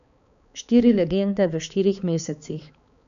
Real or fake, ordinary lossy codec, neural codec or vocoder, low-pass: fake; none; codec, 16 kHz, 4 kbps, X-Codec, HuBERT features, trained on balanced general audio; 7.2 kHz